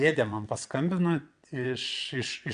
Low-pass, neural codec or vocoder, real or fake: 9.9 kHz; vocoder, 22.05 kHz, 80 mel bands, WaveNeXt; fake